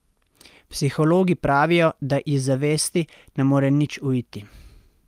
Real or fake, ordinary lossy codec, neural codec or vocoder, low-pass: real; Opus, 32 kbps; none; 14.4 kHz